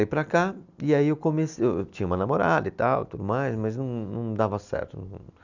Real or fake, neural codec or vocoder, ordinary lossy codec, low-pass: real; none; none; 7.2 kHz